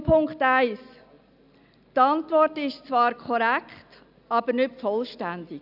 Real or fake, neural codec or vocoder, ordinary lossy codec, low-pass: real; none; none; 5.4 kHz